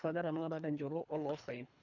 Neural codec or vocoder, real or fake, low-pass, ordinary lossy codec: codec, 24 kHz, 3 kbps, HILCodec; fake; 7.2 kHz; none